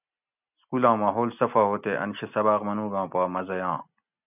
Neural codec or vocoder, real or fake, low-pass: none; real; 3.6 kHz